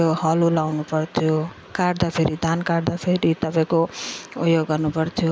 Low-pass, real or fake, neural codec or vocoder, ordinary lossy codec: none; real; none; none